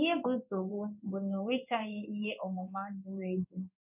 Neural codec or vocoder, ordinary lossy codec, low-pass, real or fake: codec, 16 kHz in and 24 kHz out, 1 kbps, XY-Tokenizer; none; 3.6 kHz; fake